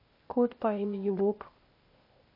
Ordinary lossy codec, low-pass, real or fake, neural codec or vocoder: MP3, 32 kbps; 5.4 kHz; fake; codec, 16 kHz, 0.8 kbps, ZipCodec